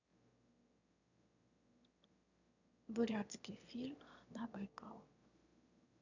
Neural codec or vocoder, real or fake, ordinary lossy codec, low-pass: autoencoder, 22.05 kHz, a latent of 192 numbers a frame, VITS, trained on one speaker; fake; none; 7.2 kHz